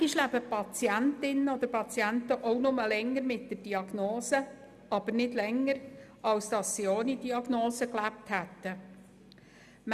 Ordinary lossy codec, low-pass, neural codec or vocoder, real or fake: none; 14.4 kHz; none; real